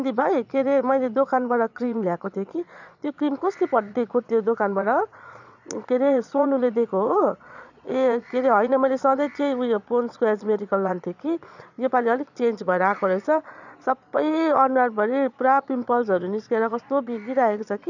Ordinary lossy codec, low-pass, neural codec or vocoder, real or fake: none; 7.2 kHz; vocoder, 22.05 kHz, 80 mel bands, Vocos; fake